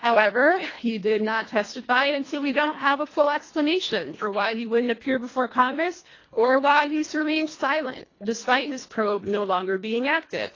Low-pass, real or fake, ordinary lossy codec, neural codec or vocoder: 7.2 kHz; fake; AAC, 32 kbps; codec, 24 kHz, 1.5 kbps, HILCodec